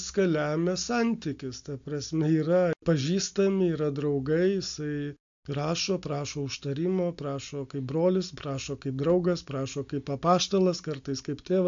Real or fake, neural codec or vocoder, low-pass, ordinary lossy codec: real; none; 7.2 kHz; AAC, 64 kbps